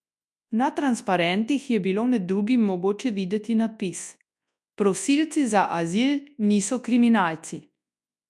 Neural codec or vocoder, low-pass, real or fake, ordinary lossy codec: codec, 24 kHz, 0.9 kbps, WavTokenizer, large speech release; none; fake; none